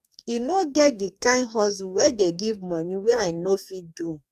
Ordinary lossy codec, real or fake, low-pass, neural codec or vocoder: AAC, 96 kbps; fake; 14.4 kHz; codec, 44.1 kHz, 2.6 kbps, DAC